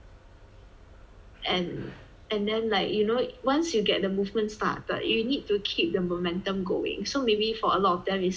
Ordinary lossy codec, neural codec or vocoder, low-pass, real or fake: none; none; none; real